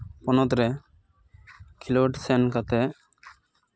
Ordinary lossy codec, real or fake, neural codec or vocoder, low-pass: none; real; none; none